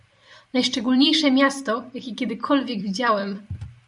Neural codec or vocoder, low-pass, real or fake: vocoder, 44.1 kHz, 128 mel bands every 256 samples, BigVGAN v2; 10.8 kHz; fake